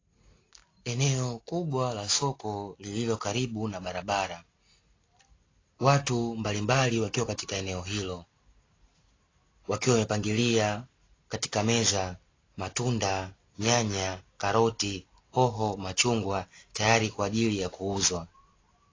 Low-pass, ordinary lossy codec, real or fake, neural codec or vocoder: 7.2 kHz; AAC, 32 kbps; real; none